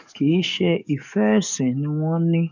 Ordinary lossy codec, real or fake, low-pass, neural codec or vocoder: none; fake; 7.2 kHz; codec, 44.1 kHz, 7.8 kbps, DAC